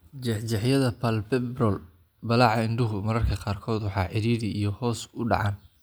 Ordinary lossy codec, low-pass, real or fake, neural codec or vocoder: none; none; real; none